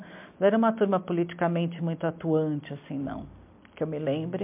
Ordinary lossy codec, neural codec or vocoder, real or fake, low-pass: MP3, 32 kbps; none; real; 3.6 kHz